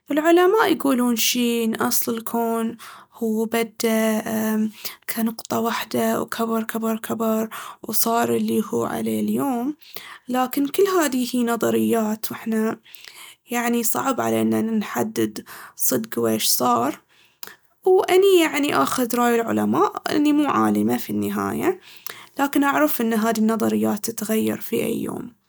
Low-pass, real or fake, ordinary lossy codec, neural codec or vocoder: none; real; none; none